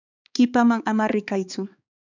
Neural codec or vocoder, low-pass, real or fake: codec, 16 kHz, 4 kbps, X-Codec, HuBERT features, trained on balanced general audio; 7.2 kHz; fake